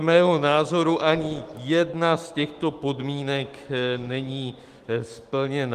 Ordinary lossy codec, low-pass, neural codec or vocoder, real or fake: Opus, 24 kbps; 14.4 kHz; autoencoder, 48 kHz, 128 numbers a frame, DAC-VAE, trained on Japanese speech; fake